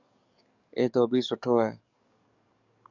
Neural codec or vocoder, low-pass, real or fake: codec, 44.1 kHz, 7.8 kbps, DAC; 7.2 kHz; fake